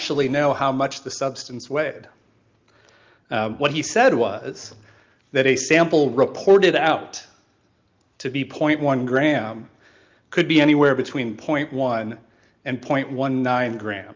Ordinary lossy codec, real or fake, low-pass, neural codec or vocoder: Opus, 24 kbps; real; 7.2 kHz; none